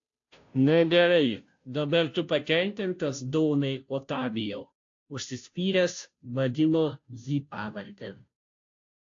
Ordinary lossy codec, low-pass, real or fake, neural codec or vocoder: AAC, 48 kbps; 7.2 kHz; fake; codec, 16 kHz, 0.5 kbps, FunCodec, trained on Chinese and English, 25 frames a second